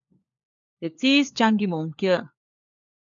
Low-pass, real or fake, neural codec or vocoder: 7.2 kHz; fake; codec, 16 kHz, 4 kbps, FunCodec, trained on LibriTTS, 50 frames a second